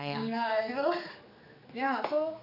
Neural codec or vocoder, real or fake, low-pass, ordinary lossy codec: codec, 16 kHz, 4 kbps, X-Codec, HuBERT features, trained on general audio; fake; 5.4 kHz; none